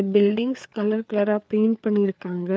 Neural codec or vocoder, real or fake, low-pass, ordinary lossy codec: codec, 16 kHz, 4 kbps, FreqCodec, larger model; fake; none; none